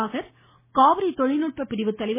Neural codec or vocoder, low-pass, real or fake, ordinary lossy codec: none; 3.6 kHz; real; MP3, 16 kbps